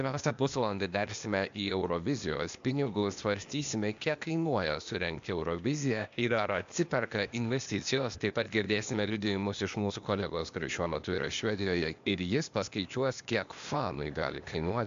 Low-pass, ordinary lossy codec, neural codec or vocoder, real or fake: 7.2 kHz; MP3, 64 kbps; codec, 16 kHz, 0.8 kbps, ZipCodec; fake